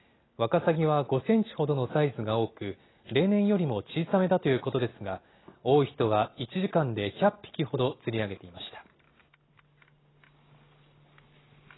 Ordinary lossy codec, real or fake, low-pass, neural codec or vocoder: AAC, 16 kbps; real; 7.2 kHz; none